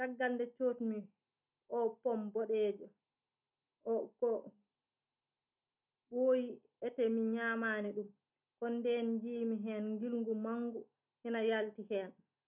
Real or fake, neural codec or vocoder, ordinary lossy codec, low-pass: real; none; none; 3.6 kHz